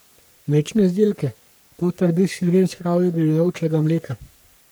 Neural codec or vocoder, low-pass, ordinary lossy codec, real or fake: codec, 44.1 kHz, 3.4 kbps, Pupu-Codec; none; none; fake